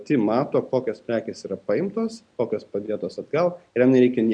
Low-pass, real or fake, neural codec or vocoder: 9.9 kHz; real; none